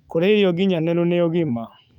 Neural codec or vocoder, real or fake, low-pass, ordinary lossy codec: autoencoder, 48 kHz, 128 numbers a frame, DAC-VAE, trained on Japanese speech; fake; 19.8 kHz; none